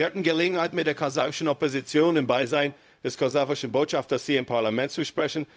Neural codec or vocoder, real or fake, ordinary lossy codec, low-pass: codec, 16 kHz, 0.4 kbps, LongCat-Audio-Codec; fake; none; none